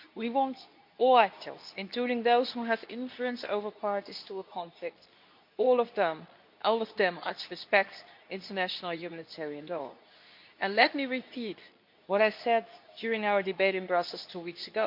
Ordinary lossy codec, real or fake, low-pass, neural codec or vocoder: none; fake; 5.4 kHz; codec, 24 kHz, 0.9 kbps, WavTokenizer, medium speech release version 2